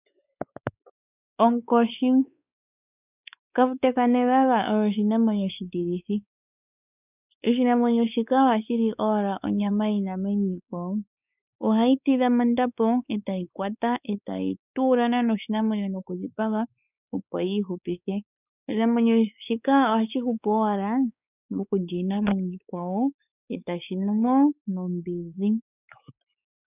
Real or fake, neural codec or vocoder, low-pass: fake; codec, 16 kHz, 4 kbps, X-Codec, WavLM features, trained on Multilingual LibriSpeech; 3.6 kHz